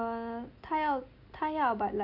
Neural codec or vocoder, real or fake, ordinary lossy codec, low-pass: none; real; none; 5.4 kHz